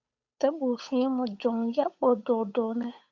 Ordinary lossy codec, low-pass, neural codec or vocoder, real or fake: Opus, 64 kbps; 7.2 kHz; codec, 16 kHz, 8 kbps, FunCodec, trained on Chinese and English, 25 frames a second; fake